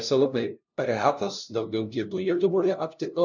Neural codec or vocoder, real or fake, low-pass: codec, 16 kHz, 0.5 kbps, FunCodec, trained on LibriTTS, 25 frames a second; fake; 7.2 kHz